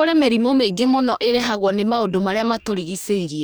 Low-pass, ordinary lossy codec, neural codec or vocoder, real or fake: none; none; codec, 44.1 kHz, 2.6 kbps, DAC; fake